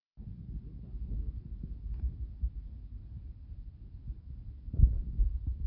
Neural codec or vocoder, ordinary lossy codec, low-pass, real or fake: none; none; 5.4 kHz; real